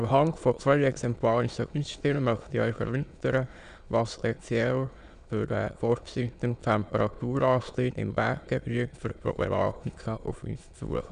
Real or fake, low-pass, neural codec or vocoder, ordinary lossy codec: fake; 9.9 kHz; autoencoder, 22.05 kHz, a latent of 192 numbers a frame, VITS, trained on many speakers; none